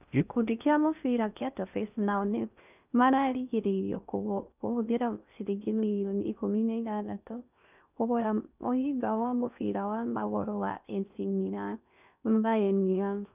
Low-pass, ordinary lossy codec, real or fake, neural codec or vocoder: 3.6 kHz; none; fake; codec, 16 kHz in and 24 kHz out, 0.6 kbps, FocalCodec, streaming, 4096 codes